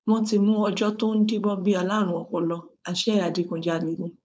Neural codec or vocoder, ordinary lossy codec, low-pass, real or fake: codec, 16 kHz, 4.8 kbps, FACodec; none; none; fake